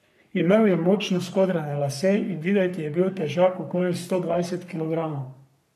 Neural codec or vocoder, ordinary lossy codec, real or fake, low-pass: codec, 44.1 kHz, 3.4 kbps, Pupu-Codec; none; fake; 14.4 kHz